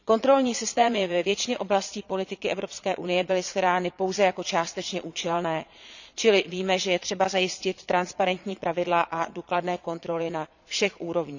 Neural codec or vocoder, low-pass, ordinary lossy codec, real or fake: vocoder, 22.05 kHz, 80 mel bands, Vocos; 7.2 kHz; none; fake